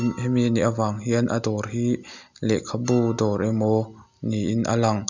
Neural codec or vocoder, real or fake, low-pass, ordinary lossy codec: none; real; 7.2 kHz; none